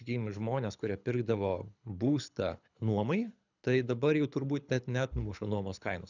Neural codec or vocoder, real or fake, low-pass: codec, 24 kHz, 6 kbps, HILCodec; fake; 7.2 kHz